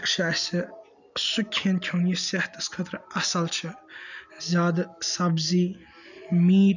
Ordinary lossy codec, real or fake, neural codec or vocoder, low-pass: none; real; none; 7.2 kHz